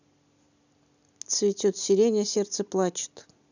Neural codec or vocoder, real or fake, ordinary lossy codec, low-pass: none; real; none; 7.2 kHz